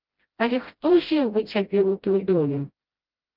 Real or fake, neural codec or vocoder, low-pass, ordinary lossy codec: fake; codec, 16 kHz, 0.5 kbps, FreqCodec, smaller model; 5.4 kHz; Opus, 32 kbps